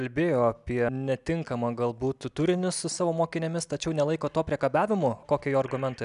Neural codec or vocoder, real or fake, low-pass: none; real; 10.8 kHz